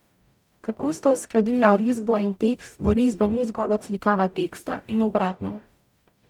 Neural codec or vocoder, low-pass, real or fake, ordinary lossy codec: codec, 44.1 kHz, 0.9 kbps, DAC; 19.8 kHz; fake; none